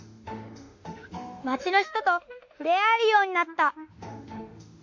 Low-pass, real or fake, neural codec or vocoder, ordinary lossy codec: 7.2 kHz; fake; autoencoder, 48 kHz, 32 numbers a frame, DAC-VAE, trained on Japanese speech; MP3, 48 kbps